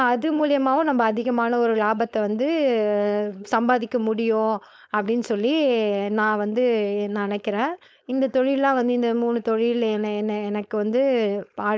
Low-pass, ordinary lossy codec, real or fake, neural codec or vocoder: none; none; fake; codec, 16 kHz, 4.8 kbps, FACodec